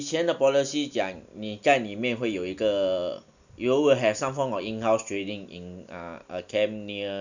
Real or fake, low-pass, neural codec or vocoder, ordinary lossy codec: real; 7.2 kHz; none; none